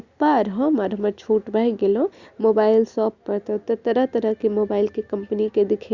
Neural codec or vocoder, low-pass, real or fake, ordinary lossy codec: none; 7.2 kHz; real; Opus, 64 kbps